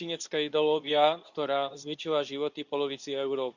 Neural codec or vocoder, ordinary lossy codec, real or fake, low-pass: codec, 24 kHz, 0.9 kbps, WavTokenizer, medium speech release version 2; none; fake; 7.2 kHz